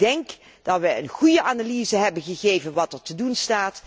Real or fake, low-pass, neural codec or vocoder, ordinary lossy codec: real; none; none; none